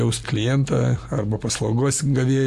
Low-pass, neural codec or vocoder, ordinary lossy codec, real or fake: 14.4 kHz; none; Opus, 64 kbps; real